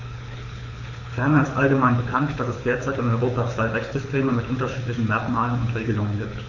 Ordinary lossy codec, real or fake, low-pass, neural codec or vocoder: AAC, 48 kbps; fake; 7.2 kHz; codec, 24 kHz, 6 kbps, HILCodec